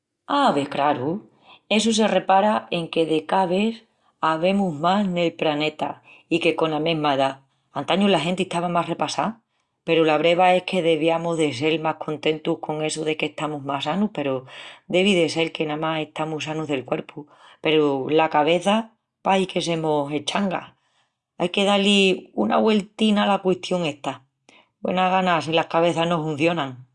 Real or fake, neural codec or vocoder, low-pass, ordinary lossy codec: real; none; 10.8 kHz; Opus, 64 kbps